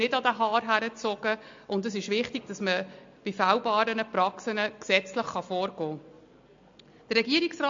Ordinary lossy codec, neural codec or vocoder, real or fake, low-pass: MP3, 48 kbps; none; real; 7.2 kHz